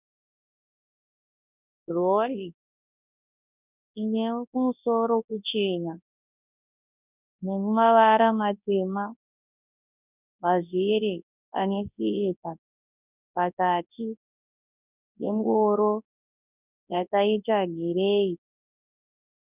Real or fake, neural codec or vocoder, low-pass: fake; codec, 24 kHz, 0.9 kbps, WavTokenizer, large speech release; 3.6 kHz